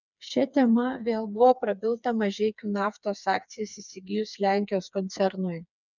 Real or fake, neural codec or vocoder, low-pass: fake; codec, 16 kHz, 4 kbps, FreqCodec, smaller model; 7.2 kHz